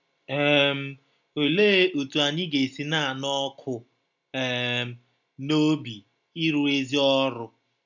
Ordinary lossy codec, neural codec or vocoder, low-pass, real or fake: none; none; 7.2 kHz; real